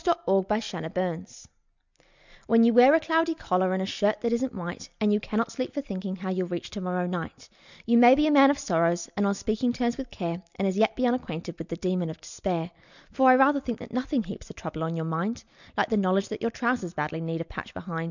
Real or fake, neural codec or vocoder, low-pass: real; none; 7.2 kHz